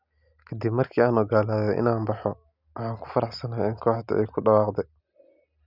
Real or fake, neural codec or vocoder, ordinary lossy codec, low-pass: real; none; none; 5.4 kHz